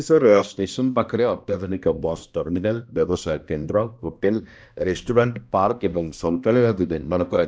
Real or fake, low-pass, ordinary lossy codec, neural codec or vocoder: fake; none; none; codec, 16 kHz, 1 kbps, X-Codec, HuBERT features, trained on balanced general audio